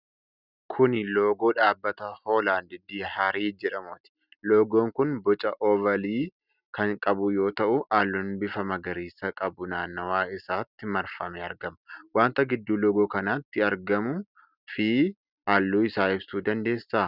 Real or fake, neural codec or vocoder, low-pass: real; none; 5.4 kHz